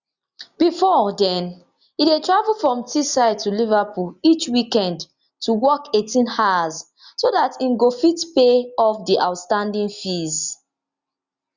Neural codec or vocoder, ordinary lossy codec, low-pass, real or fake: none; Opus, 64 kbps; 7.2 kHz; real